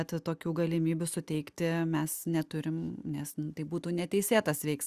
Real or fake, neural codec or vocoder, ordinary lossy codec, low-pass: real; none; Opus, 64 kbps; 14.4 kHz